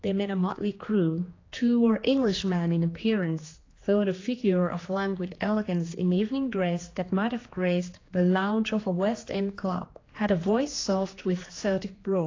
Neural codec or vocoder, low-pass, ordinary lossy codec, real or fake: codec, 16 kHz, 2 kbps, X-Codec, HuBERT features, trained on general audio; 7.2 kHz; AAC, 32 kbps; fake